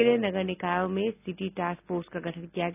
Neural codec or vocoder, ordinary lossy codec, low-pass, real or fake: none; none; 3.6 kHz; real